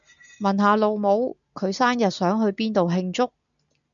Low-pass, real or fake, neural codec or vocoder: 7.2 kHz; real; none